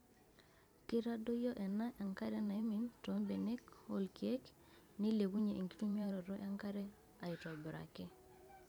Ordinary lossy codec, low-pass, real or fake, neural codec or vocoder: none; none; fake; vocoder, 44.1 kHz, 128 mel bands every 512 samples, BigVGAN v2